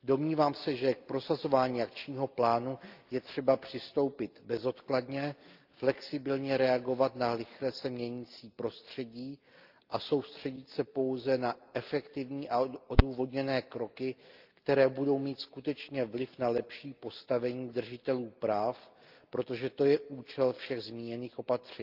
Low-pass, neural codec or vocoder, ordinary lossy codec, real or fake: 5.4 kHz; none; Opus, 24 kbps; real